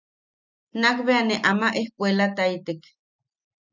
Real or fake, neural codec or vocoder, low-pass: real; none; 7.2 kHz